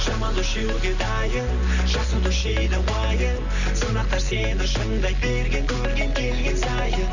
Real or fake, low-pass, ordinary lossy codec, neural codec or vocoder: real; 7.2 kHz; none; none